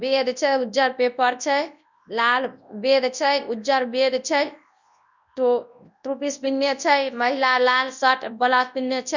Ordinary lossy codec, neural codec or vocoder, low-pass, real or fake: none; codec, 24 kHz, 0.9 kbps, WavTokenizer, large speech release; 7.2 kHz; fake